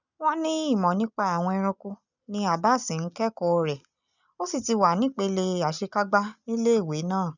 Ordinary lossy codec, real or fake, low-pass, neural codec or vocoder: none; real; 7.2 kHz; none